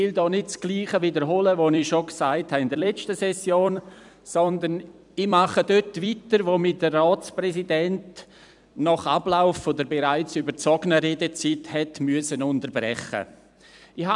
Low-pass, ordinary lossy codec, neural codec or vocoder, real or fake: 10.8 kHz; none; vocoder, 44.1 kHz, 128 mel bands every 256 samples, BigVGAN v2; fake